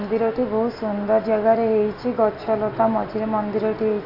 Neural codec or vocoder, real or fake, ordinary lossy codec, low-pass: none; real; AAC, 32 kbps; 5.4 kHz